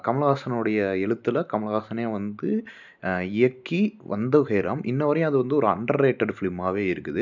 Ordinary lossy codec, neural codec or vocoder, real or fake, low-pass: none; none; real; 7.2 kHz